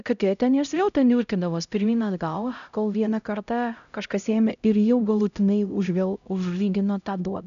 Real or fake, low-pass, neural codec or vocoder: fake; 7.2 kHz; codec, 16 kHz, 0.5 kbps, X-Codec, HuBERT features, trained on LibriSpeech